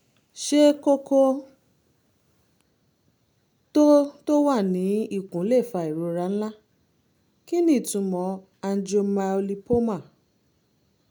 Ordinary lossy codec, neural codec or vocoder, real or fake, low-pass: none; none; real; none